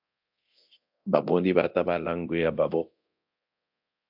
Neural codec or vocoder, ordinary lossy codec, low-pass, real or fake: codec, 24 kHz, 0.9 kbps, DualCodec; AAC, 48 kbps; 5.4 kHz; fake